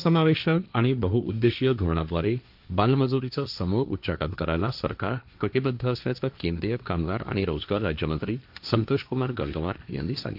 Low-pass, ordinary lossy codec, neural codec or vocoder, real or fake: 5.4 kHz; AAC, 48 kbps; codec, 16 kHz, 1.1 kbps, Voila-Tokenizer; fake